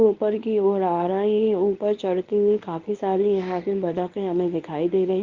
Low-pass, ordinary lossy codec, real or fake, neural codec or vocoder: 7.2 kHz; Opus, 24 kbps; fake; codec, 24 kHz, 0.9 kbps, WavTokenizer, medium speech release version 1